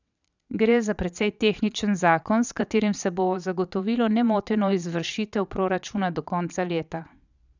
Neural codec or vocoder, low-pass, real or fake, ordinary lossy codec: vocoder, 22.05 kHz, 80 mel bands, Vocos; 7.2 kHz; fake; none